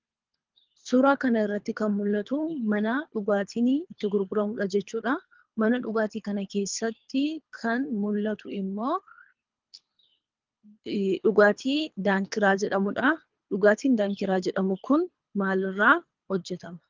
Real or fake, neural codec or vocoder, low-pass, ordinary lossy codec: fake; codec, 24 kHz, 3 kbps, HILCodec; 7.2 kHz; Opus, 32 kbps